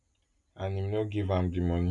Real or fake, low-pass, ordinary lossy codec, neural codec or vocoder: real; 10.8 kHz; none; none